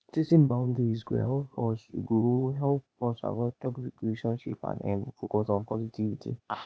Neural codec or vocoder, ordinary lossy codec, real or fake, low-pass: codec, 16 kHz, 0.8 kbps, ZipCodec; none; fake; none